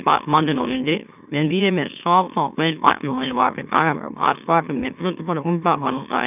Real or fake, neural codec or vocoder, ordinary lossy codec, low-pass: fake; autoencoder, 44.1 kHz, a latent of 192 numbers a frame, MeloTTS; none; 3.6 kHz